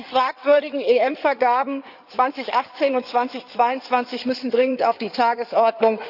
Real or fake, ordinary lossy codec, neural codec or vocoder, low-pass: fake; none; codec, 16 kHz in and 24 kHz out, 2.2 kbps, FireRedTTS-2 codec; 5.4 kHz